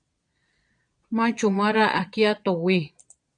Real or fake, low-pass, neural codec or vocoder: fake; 9.9 kHz; vocoder, 22.05 kHz, 80 mel bands, Vocos